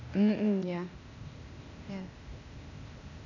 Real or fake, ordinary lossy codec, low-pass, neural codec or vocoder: fake; none; 7.2 kHz; codec, 16 kHz, 0.8 kbps, ZipCodec